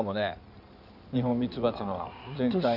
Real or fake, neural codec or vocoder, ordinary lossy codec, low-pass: fake; codec, 16 kHz in and 24 kHz out, 2.2 kbps, FireRedTTS-2 codec; none; 5.4 kHz